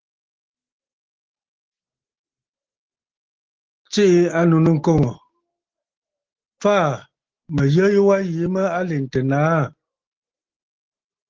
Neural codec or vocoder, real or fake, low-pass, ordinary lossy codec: none; real; 7.2 kHz; Opus, 16 kbps